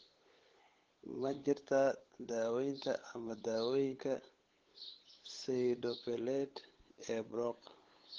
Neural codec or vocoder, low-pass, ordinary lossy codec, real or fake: codec, 16 kHz, 8 kbps, FunCodec, trained on LibriTTS, 25 frames a second; 7.2 kHz; Opus, 16 kbps; fake